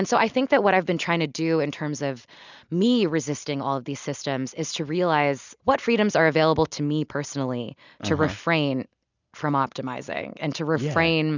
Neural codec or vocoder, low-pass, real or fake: none; 7.2 kHz; real